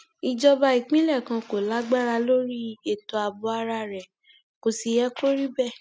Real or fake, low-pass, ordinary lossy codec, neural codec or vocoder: real; none; none; none